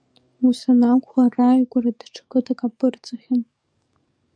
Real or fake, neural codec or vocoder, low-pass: fake; codec, 44.1 kHz, 7.8 kbps, DAC; 9.9 kHz